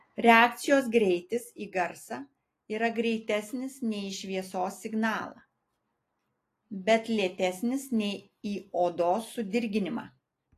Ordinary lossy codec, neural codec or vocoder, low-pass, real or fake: AAC, 48 kbps; none; 14.4 kHz; real